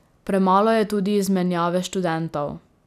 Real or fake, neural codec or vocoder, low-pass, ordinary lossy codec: real; none; 14.4 kHz; none